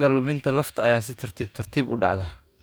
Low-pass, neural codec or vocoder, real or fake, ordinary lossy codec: none; codec, 44.1 kHz, 2.6 kbps, DAC; fake; none